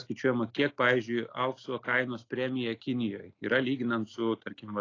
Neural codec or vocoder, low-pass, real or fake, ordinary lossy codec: none; 7.2 kHz; real; AAC, 32 kbps